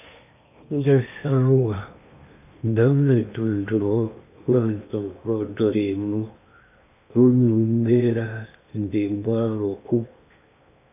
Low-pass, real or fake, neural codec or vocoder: 3.6 kHz; fake; codec, 16 kHz in and 24 kHz out, 0.8 kbps, FocalCodec, streaming, 65536 codes